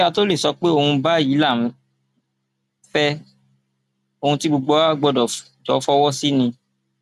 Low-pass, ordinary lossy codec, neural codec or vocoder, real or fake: 14.4 kHz; none; none; real